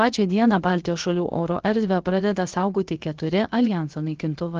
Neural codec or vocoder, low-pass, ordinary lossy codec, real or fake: codec, 16 kHz, about 1 kbps, DyCAST, with the encoder's durations; 7.2 kHz; Opus, 16 kbps; fake